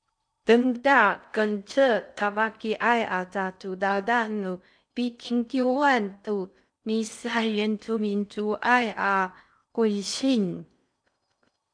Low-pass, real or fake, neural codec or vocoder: 9.9 kHz; fake; codec, 16 kHz in and 24 kHz out, 0.6 kbps, FocalCodec, streaming, 4096 codes